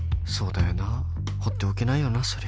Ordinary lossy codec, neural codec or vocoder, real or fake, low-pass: none; none; real; none